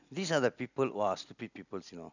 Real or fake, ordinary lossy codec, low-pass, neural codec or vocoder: real; none; 7.2 kHz; none